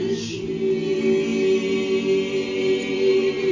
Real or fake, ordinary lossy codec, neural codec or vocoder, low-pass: real; MP3, 32 kbps; none; 7.2 kHz